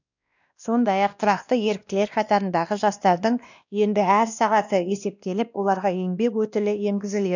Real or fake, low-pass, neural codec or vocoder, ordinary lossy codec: fake; 7.2 kHz; codec, 16 kHz, 1 kbps, X-Codec, WavLM features, trained on Multilingual LibriSpeech; none